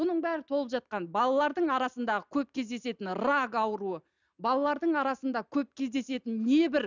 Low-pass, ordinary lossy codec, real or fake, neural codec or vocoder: 7.2 kHz; none; real; none